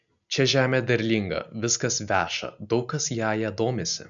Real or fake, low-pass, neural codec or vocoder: real; 7.2 kHz; none